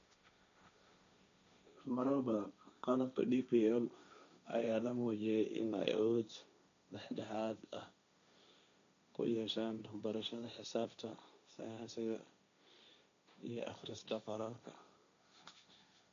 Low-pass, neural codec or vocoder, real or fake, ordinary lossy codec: 7.2 kHz; codec, 16 kHz, 1.1 kbps, Voila-Tokenizer; fake; MP3, 64 kbps